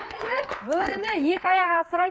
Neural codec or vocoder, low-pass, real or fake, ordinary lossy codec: codec, 16 kHz, 4 kbps, FreqCodec, larger model; none; fake; none